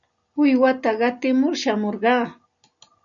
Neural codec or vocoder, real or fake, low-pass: none; real; 7.2 kHz